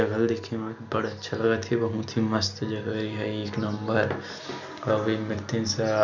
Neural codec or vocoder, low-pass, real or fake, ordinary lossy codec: none; 7.2 kHz; real; none